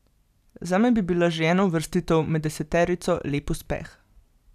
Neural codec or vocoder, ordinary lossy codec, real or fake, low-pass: none; none; real; 14.4 kHz